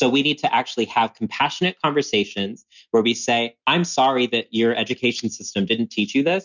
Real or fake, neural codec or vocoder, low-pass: real; none; 7.2 kHz